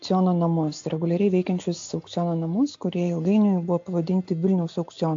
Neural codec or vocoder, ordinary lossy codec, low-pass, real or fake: none; AAC, 48 kbps; 7.2 kHz; real